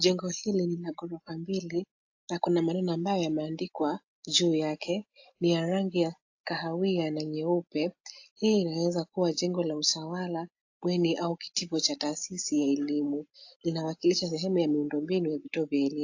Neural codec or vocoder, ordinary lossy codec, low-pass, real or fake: none; AAC, 48 kbps; 7.2 kHz; real